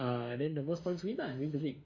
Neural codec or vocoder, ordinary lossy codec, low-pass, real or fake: autoencoder, 48 kHz, 32 numbers a frame, DAC-VAE, trained on Japanese speech; Opus, 64 kbps; 7.2 kHz; fake